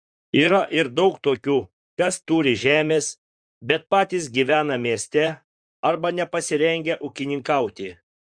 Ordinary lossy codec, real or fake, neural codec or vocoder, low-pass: Opus, 64 kbps; fake; vocoder, 44.1 kHz, 128 mel bands, Pupu-Vocoder; 9.9 kHz